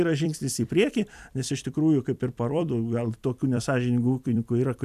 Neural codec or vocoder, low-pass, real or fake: vocoder, 44.1 kHz, 128 mel bands every 256 samples, BigVGAN v2; 14.4 kHz; fake